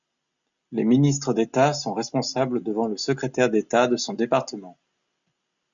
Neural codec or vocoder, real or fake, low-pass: none; real; 7.2 kHz